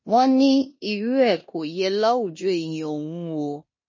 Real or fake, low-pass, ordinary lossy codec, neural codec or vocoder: fake; 7.2 kHz; MP3, 32 kbps; codec, 16 kHz in and 24 kHz out, 0.9 kbps, LongCat-Audio-Codec, four codebook decoder